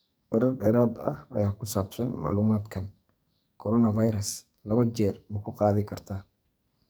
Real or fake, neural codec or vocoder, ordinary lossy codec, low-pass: fake; codec, 44.1 kHz, 2.6 kbps, SNAC; none; none